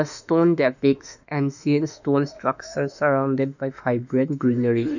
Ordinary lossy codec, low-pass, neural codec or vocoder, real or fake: none; 7.2 kHz; autoencoder, 48 kHz, 32 numbers a frame, DAC-VAE, trained on Japanese speech; fake